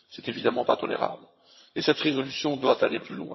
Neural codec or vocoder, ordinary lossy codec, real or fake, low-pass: vocoder, 22.05 kHz, 80 mel bands, HiFi-GAN; MP3, 24 kbps; fake; 7.2 kHz